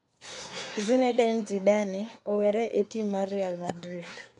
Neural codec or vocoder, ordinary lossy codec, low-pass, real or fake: codec, 24 kHz, 1 kbps, SNAC; none; 10.8 kHz; fake